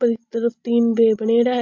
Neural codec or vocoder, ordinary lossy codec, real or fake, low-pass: none; AAC, 48 kbps; real; 7.2 kHz